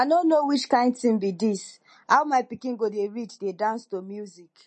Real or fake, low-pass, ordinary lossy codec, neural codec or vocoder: real; 10.8 kHz; MP3, 32 kbps; none